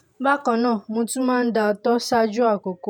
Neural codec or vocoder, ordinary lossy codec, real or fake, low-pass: vocoder, 48 kHz, 128 mel bands, Vocos; none; fake; 19.8 kHz